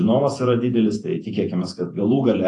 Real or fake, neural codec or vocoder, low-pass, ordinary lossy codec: fake; vocoder, 48 kHz, 128 mel bands, Vocos; 10.8 kHz; AAC, 48 kbps